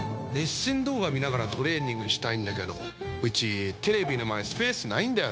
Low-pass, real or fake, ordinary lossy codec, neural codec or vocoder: none; fake; none; codec, 16 kHz, 0.9 kbps, LongCat-Audio-Codec